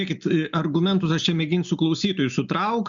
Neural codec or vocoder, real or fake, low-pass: none; real; 7.2 kHz